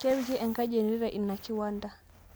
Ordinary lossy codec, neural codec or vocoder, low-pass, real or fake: none; none; none; real